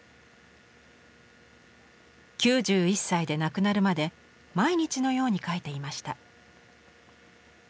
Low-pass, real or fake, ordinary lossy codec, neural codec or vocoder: none; real; none; none